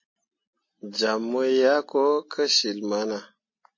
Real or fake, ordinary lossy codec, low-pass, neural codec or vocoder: real; MP3, 32 kbps; 7.2 kHz; none